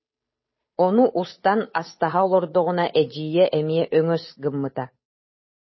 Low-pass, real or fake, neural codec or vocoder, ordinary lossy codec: 7.2 kHz; fake; codec, 16 kHz, 8 kbps, FunCodec, trained on Chinese and English, 25 frames a second; MP3, 24 kbps